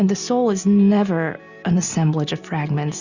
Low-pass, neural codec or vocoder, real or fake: 7.2 kHz; none; real